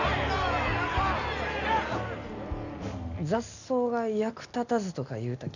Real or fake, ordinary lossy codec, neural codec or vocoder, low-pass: real; none; none; 7.2 kHz